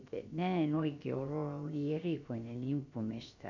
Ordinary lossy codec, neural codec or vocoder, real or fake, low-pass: MP3, 64 kbps; codec, 16 kHz, about 1 kbps, DyCAST, with the encoder's durations; fake; 7.2 kHz